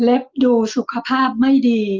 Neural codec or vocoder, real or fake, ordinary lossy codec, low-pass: none; real; Opus, 24 kbps; 7.2 kHz